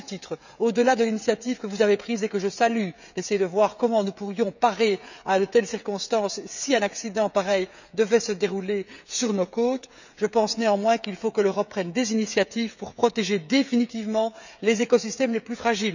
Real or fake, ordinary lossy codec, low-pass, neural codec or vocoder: fake; none; 7.2 kHz; codec, 16 kHz, 16 kbps, FreqCodec, smaller model